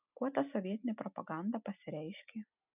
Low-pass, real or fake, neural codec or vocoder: 3.6 kHz; real; none